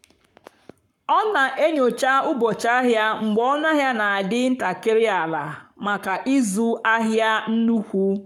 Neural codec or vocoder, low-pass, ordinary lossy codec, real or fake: codec, 44.1 kHz, 7.8 kbps, Pupu-Codec; 19.8 kHz; none; fake